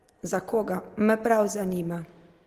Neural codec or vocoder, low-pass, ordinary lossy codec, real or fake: none; 14.4 kHz; Opus, 24 kbps; real